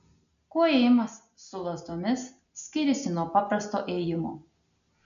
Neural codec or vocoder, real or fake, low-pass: none; real; 7.2 kHz